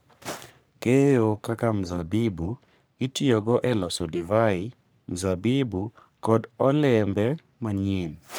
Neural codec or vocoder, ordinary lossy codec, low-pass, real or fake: codec, 44.1 kHz, 3.4 kbps, Pupu-Codec; none; none; fake